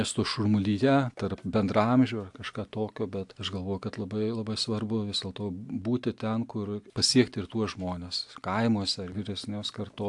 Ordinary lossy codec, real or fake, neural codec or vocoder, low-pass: AAC, 64 kbps; real; none; 10.8 kHz